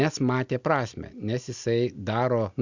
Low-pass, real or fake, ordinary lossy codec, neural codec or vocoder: 7.2 kHz; real; Opus, 64 kbps; none